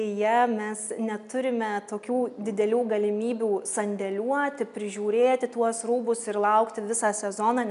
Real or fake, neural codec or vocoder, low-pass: real; none; 10.8 kHz